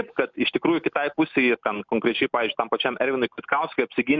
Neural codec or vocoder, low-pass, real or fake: none; 7.2 kHz; real